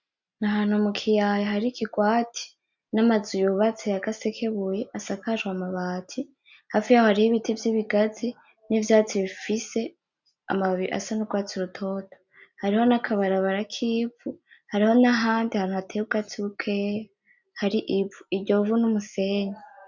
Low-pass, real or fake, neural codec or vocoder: 7.2 kHz; real; none